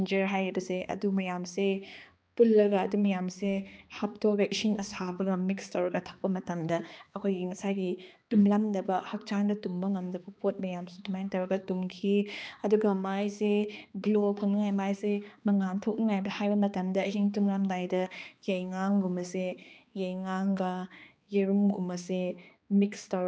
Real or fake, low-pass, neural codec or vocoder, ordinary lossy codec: fake; none; codec, 16 kHz, 2 kbps, X-Codec, HuBERT features, trained on balanced general audio; none